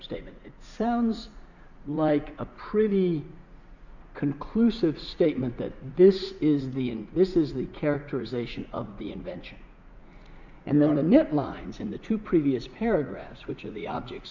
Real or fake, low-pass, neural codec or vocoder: fake; 7.2 kHz; vocoder, 44.1 kHz, 80 mel bands, Vocos